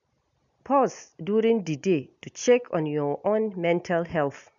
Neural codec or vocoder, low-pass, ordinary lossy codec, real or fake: none; 7.2 kHz; none; real